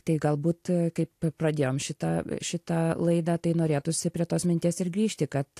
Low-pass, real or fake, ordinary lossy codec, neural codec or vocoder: 14.4 kHz; real; AAC, 64 kbps; none